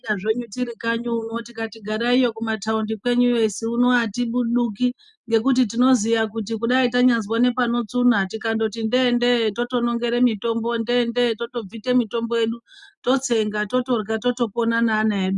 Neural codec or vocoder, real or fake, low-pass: none; real; 10.8 kHz